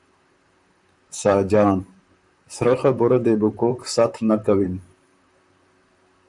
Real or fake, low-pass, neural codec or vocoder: fake; 10.8 kHz; vocoder, 44.1 kHz, 128 mel bands, Pupu-Vocoder